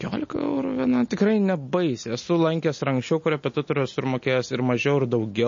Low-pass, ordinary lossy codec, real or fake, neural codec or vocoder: 7.2 kHz; MP3, 32 kbps; real; none